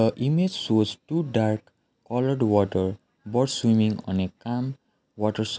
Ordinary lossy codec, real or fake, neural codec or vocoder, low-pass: none; real; none; none